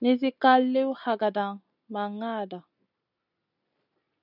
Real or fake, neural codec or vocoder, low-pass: real; none; 5.4 kHz